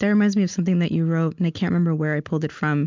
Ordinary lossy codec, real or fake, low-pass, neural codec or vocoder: MP3, 64 kbps; real; 7.2 kHz; none